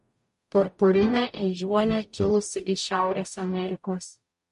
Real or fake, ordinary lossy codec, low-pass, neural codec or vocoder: fake; MP3, 48 kbps; 14.4 kHz; codec, 44.1 kHz, 0.9 kbps, DAC